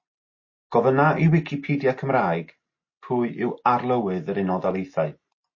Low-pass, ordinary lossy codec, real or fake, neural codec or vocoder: 7.2 kHz; MP3, 32 kbps; real; none